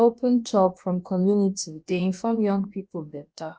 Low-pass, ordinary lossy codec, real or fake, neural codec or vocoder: none; none; fake; codec, 16 kHz, about 1 kbps, DyCAST, with the encoder's durations